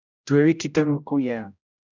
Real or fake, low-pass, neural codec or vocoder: fake; 7.2 kHz; codec, 16 kHz, 0.5 kbps, X-Codec, HuBERT features, trained on general audio